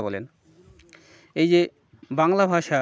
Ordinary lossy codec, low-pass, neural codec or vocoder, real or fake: none; none; none; real